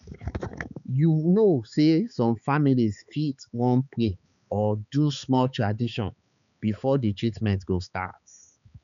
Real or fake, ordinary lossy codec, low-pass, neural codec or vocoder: fake; none; 7.2 kHz; codec, 16 kHz, 4 kbps, X-Codec, HuBERT features, trained on balanced general audio